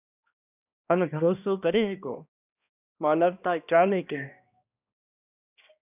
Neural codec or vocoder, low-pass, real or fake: codec, 16 kHz, 1 kbps, X-Codec, HuBERT features, trained on balanced general audio; 3.6 kHz; fake